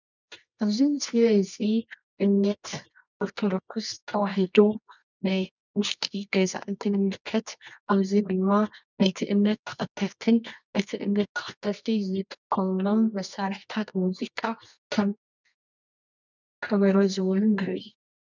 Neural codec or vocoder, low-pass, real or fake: codec, 24 kHz, 0.9 kbps, WavTokenizer, medium music audio release; 7.2 kHz; fake